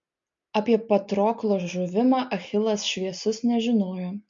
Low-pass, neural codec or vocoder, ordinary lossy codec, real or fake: 7.2 kHz; none; MP3, 48 kbps; real